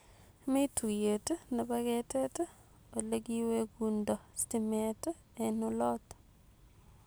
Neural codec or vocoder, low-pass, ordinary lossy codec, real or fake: none; none; none; real